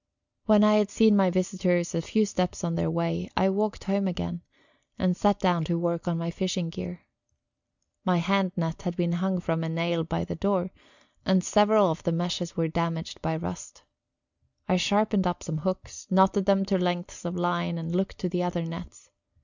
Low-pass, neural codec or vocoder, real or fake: 7.2 kHz; none; real